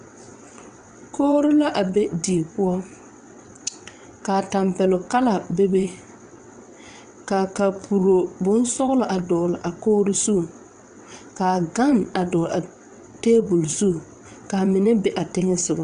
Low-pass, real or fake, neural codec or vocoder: 9.9 kHz; fake; vocoder, 22.05 kHz, 80 mel bands, WaveNeXt